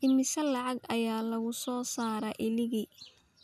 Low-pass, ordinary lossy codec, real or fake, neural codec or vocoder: 14.4 kHz; none; real; none